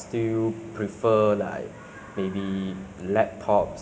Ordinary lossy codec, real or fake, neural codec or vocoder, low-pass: none; real; none; none